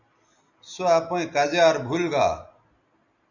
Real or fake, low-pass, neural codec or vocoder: real; 7.2 kHz; none